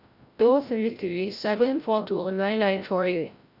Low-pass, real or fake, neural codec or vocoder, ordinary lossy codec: 5.4 kHz; fake; codec, 16 kHz, 0.5 kbps, FreqCodec, larger model; AAC, 48 kbps